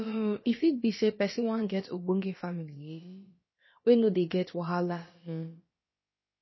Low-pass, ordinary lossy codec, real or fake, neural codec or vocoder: 7.2 kHz; MP3, 24 kbps; fake; codec, 16 kHz, about 1 kbps, DyCAST, with the encoder's durations